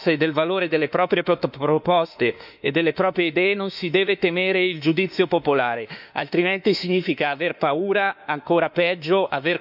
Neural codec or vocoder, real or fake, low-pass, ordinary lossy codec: autoencoder, 48 kHz, 32 numbers a frame, DAC-VAE, trained on Japanese speech; fake; 5.4 kHz; none